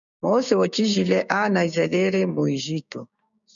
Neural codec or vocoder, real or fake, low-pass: codec, 16 kHz, 6 kbps, DAC; fake; 7.2 kHz